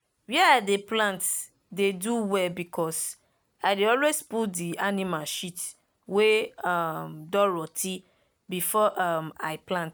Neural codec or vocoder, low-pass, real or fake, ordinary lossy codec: none; none; real; none